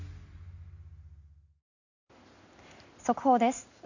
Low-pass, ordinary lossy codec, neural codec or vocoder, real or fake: 7.2 kHz; none; none; real